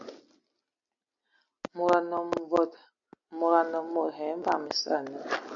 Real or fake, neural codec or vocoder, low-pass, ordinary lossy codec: real; none; 7.2 kHz; MP3, 96 kbps